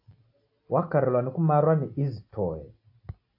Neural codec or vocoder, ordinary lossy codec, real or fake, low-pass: none; AAC, 32 kbps; real; 5.4 kHz